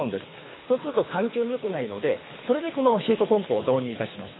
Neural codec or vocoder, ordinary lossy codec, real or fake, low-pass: codec, 24 kHz, 3 kbps, HILCodec; AAC, 16 kbps; fake; 7.2 kHz